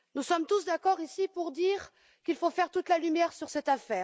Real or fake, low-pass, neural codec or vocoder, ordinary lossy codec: real; none; none; none